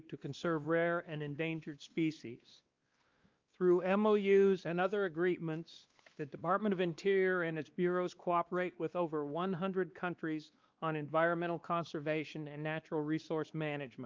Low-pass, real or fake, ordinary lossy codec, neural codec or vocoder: 7.2 kHz; fake; Opus, 32 kbps; codec, 16 kHz, 2 kbps, X-Codec, WavLM features, trained on Multilingual LibriSpeech